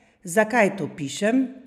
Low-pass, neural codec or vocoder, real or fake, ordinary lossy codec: 14.4 kHz; none; real; none